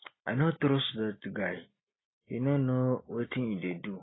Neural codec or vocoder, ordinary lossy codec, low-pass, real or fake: none; AAC, 16 kbps; 7.2 kHz; real